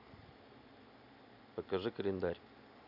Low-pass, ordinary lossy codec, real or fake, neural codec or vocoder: 5.4 kHz; none; real; none